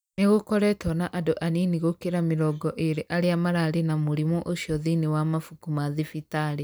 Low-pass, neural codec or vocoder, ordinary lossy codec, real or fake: none; none; none; real